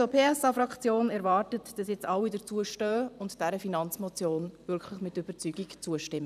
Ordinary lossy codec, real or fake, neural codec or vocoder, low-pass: none; fake; vocoder, 44.1 kHz, 128 mel bands every 512 samples, BigVGAN v2; 14.4 kHz